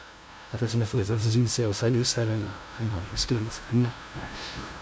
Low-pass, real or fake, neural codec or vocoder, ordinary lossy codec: none; fake; codec, 16 kHz, 0.5 kbps, FunCodec, trained on LibriTTS, 25 frames a second; none